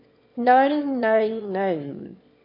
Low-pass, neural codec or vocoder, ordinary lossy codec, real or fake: 5.4 kHz; autoencoder, 22.05 kHz, a latent of 192 numbers a frame, VITS, trained on one speaker; MP3, 32 kbps; fake